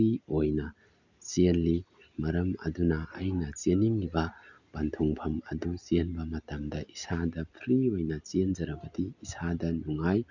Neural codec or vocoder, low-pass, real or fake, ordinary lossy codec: none; 7.2 kHz; real; none